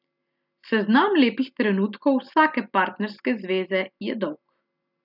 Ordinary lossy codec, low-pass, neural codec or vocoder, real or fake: none; 5.4 kHz; none; real